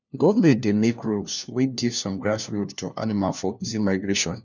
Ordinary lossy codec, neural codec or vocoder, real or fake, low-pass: none; codec, 16 kHz, 1 kbps, FunCodec, trained on LibriTTS, 50 frames a second; fake; 7.2 kHz